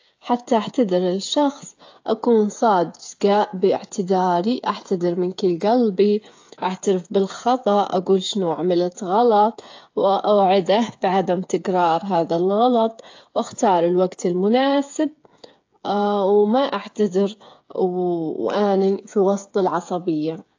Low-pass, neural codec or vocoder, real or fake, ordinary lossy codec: 7.2 kHz; codec, 16 kHz, 8 kbps, FreqCodec, smaller model; fake; AAC, 48 kbps